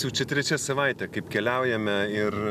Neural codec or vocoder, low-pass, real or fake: none; 14.4 kHz; real